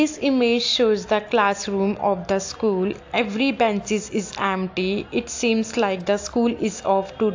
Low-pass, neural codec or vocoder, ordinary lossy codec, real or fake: 7.2 kHz; none; AAC, 48 kbps; real